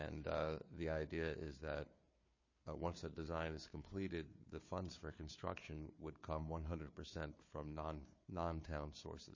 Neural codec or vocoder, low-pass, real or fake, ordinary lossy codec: codec, 16 kHz, 4 kbps, FunCodec, trained on Chinese and English, 50 frames a second; 7.2 kHz; fake; MP3, 32 kbps